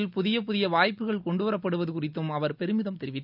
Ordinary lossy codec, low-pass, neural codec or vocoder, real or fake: none; 5.4 kHz; none; real